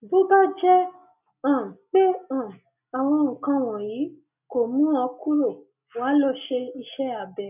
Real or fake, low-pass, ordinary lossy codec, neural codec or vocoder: real; 3.6 kHz; none; none